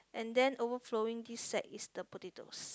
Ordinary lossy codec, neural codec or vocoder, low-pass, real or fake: none; none; none; real